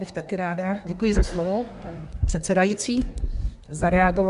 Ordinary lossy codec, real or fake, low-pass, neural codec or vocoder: Opus, 64 kbps; fake; 10.8 kHz; codec, 24 kHz, 1 kbps, SNAC